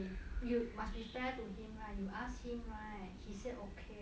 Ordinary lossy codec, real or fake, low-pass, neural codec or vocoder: none; real; none; none